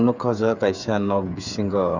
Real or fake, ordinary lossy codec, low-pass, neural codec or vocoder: fake; none; 7.2 kHz; codec, 16 kHz, 8 kbps, FreqCodec, smaller model